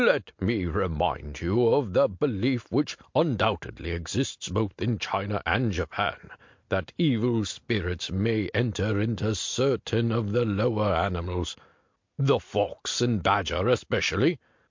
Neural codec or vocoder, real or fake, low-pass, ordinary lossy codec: none; real; 7.2 kHz; MP3, 48 kbps